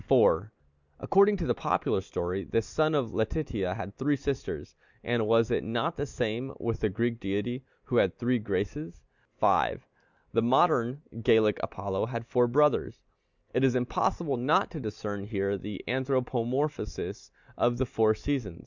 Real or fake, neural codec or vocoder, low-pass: real; none; 7.2 kHz